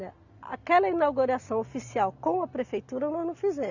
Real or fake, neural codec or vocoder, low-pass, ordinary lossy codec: real; none; 7.2 kHz; none